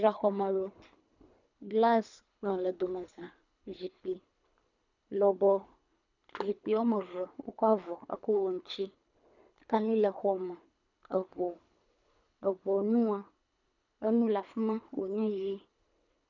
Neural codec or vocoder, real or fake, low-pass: codec, 24 kHz, 3 kbps, HILCodec; fake; 7.2 kHz